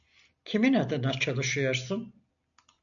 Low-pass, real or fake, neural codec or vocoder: 7.2 kHz; real; none